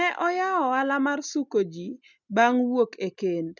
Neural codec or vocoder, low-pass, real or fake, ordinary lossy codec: none; 7.2 kHz; real; none